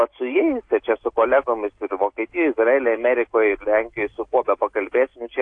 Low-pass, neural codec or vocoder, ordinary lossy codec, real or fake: 9.9 kHz; none; AAC, 48 kbps; real